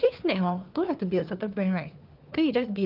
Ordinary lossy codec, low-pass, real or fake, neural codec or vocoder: Opus, 32 kbps; 5.4 kHz; fake; codec, 16 kHz, 4 kbps, FunCodec, trained on LibriTTS, 50 frames a second